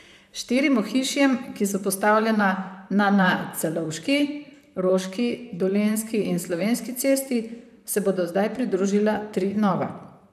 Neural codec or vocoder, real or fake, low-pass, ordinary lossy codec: vocoder, 44.1 kHz, 128 mel bands, Pupu-Vocoder; fake; 14.4 kHz; none